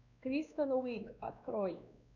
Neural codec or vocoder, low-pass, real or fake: codec, 16 kHz, 2 kbps, X-Codec, WavLM features, trained on Multilingual LibriSpeech; 7.2 kHz; fake